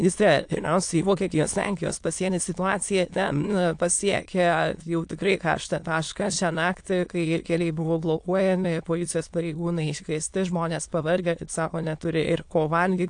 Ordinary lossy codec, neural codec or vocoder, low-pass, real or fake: AAC, 64 kbps; autoencoder, 22.05 kHz, a latent of 192 numbers a frame, VITS, trained on many speakers; 9.9 kHz; fake